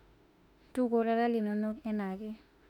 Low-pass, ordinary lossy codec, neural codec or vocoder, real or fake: 19.8 kHz; none; autoencoder, 48 kHz, 32 numbers a frame, DAC-VAE, trained on Japanese speech; fake